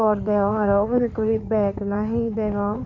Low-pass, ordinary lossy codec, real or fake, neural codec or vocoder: 7.2 kHz; MP3, 64 kbps; fake; codec, 16 kHz in and 24 kHz out, 2.2 kbps, FireRedTTS-2 codec